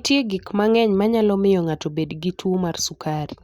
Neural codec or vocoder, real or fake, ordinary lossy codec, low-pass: none; real; Opus, 64 kbps; 19.8 kHz